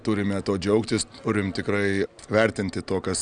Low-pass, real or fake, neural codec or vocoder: 9.9 kHz; real; none